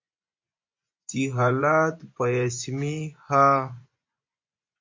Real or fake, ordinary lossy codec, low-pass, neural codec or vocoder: fake; MP3, 48 kbps; 7.2 kHz; vocoder, 24 kHz, 100 mel bands, Vocos